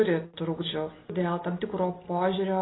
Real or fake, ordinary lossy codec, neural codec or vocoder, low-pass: real; AAC, 16 kbps; none; 7.2 kHz